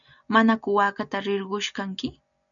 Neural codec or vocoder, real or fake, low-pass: none; real; 7.2 kHz